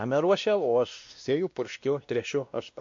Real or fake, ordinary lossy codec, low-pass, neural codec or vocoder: fake; MP3, 48 kbps; 7.2 kHz; codec, 16 kHz, 1 kbps, X-Codec, WavLM features, trained on Multilingual LibriSpeech